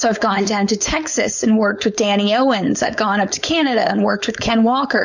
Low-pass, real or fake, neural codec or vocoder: 7.2 kHz; fake; codec, 16 kHz, 4.8 kbps, FACodec